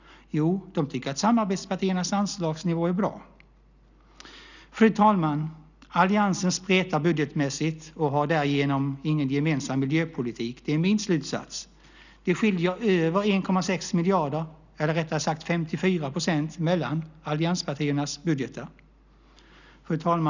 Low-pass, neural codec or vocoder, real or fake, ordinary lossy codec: 7.2 kHz; none; real; none